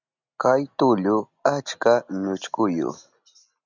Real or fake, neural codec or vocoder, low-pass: real; none; 7.2 kHz